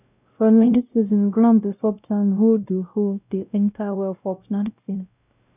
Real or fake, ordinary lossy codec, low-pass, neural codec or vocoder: fake; none; 3.6 kHz; codec, 16 kHz, 0.5 kbps, X-Codec, WavLM features, trained on Multilingual LibriSpeech